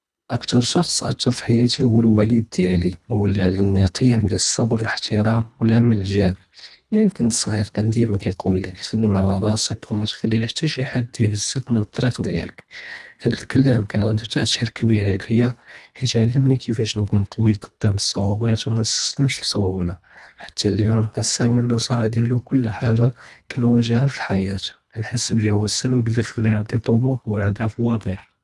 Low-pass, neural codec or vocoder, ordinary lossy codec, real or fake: none; codec, 24 kHz, 1.5 kbps, HILCodec; none; fake